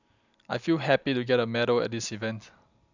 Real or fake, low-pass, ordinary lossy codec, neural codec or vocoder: real; 7.2 kHz; none; none